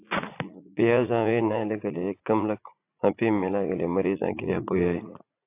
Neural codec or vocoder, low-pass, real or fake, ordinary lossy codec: vocoder, 44.1 kHz, 80 mel bands, Vocos; 3.6 kHz; fake; AAC, 24 kbps